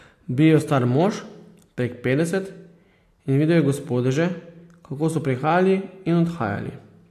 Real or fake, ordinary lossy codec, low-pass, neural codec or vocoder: real; AAC, 64 kbps; 14.4 kHz; none